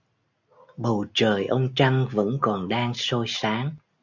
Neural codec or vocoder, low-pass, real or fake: none; 7.2 kHz; real